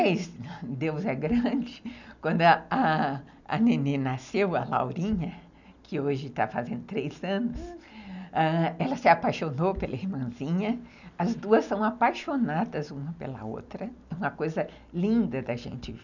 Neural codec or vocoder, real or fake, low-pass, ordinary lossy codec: none; real; 7.2 kHz; none